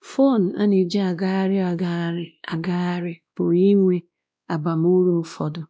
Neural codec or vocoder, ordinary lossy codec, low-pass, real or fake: codec, 16 kHz, 1 kbps, X-Codec, WavLM features, trained on Multilingual LibriSpeech; none; none; fake